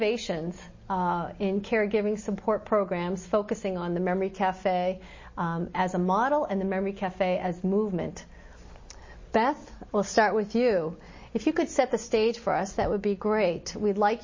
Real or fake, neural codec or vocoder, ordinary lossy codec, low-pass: real; none; MP3, 32 kbps; 7.2 kHz